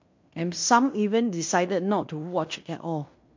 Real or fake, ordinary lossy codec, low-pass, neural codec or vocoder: fake; MP3, 48 kbps; 7.2 kHz; codec, 16 kHz in and 24 kHz out, 0.9 kbps, LongCat-Audio-Codec, fine tuned four codebook decoder